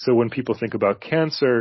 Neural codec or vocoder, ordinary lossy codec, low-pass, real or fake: none; MP3, 24 kbps; 7.2 kHz; real